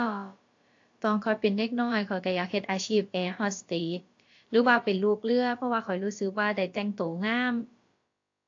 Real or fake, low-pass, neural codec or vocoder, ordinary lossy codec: fake; 7.2 kHz; codec, 16 kHz, about 1 kbps, DyCAST, with the encoder's durations; AAC, 48 kbps